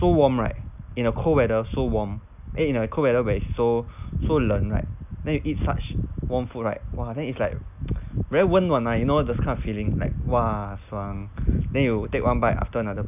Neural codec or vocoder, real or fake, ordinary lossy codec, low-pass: none; real; none; 3.6 kHz